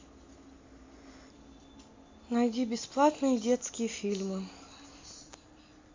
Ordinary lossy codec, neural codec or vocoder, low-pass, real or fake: MP3, 48 kbps; none; 7.2 kHz; real